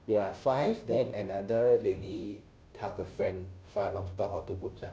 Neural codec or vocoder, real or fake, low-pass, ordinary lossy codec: codec, 16 kHz, 0.5 kbps, FunCodec, trained on Chinese and English, 25 frames a second; fake; none; none